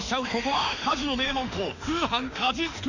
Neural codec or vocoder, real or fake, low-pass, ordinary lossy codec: autoencoder, 48 kHz, 32 numbers a frame, DAC-VAE, trained on Japanese speech; fake; 7.2 kHz; none